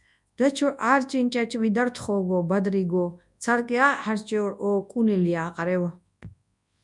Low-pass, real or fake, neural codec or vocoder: 10.8 kHz; fake; codec, 24 kHz, 0.9 kbps, WavTokenizer, large speech release